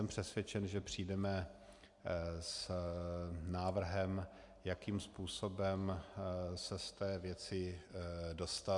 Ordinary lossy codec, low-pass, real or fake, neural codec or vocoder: AAC, 64 kbps; 10.8 kHz; real; none